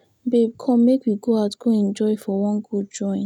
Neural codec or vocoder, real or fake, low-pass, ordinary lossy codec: none; real; 19.8 kHz; none